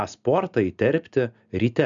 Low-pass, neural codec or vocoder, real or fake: 7.2 kHz; none; real